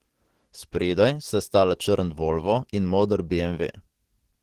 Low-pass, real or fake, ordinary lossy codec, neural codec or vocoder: 14.4 kHz; fake; Opus, 16 kbps; codec, 44.1 kHz, 7.8 kbps, DAC